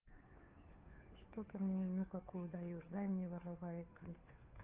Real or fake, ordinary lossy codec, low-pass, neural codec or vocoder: fake; Opus, 24 kbps; 3.6 kHz; codec, 16 kHz, 2 kbps, FunCodec, trained on Chinese and English, 25 frames a second